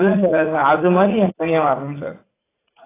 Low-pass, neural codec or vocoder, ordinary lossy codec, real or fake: 3.6 kHz; vocoder, 44.1 kHz, 80 mel bands, Vocos; AAC, 16 kbps; fake